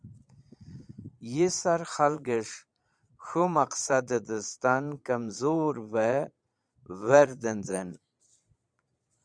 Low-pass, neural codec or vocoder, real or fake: 9.9 kHz; vocoder, 22.05 kHz, 80 mel bands, Vocos; fake